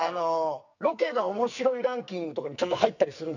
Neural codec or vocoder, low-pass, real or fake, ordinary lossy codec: codec, 44.1 kHz, 2.6 kbps, SNAC; 7.2 kHz; fake; none